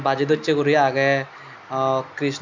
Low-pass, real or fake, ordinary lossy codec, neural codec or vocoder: 7.2 kHz; real; MP3, 64 kbps; none